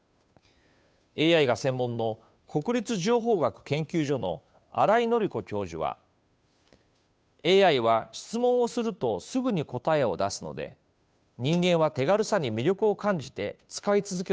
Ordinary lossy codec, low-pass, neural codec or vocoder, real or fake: none; none; codec, 16 kHz, 2 kbps, FunCodec, trained on Chinese and English, 25 frames a second; fake